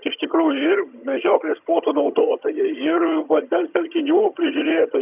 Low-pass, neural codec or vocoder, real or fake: 3.6 kHz; vocoder, 22.05 kHz, 80 mel bands, HiFi-GAN; fake